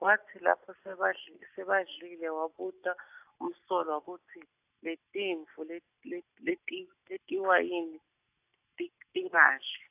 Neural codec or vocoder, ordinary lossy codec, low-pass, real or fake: none; AAC, 32 kbps; 3.6 kHz; real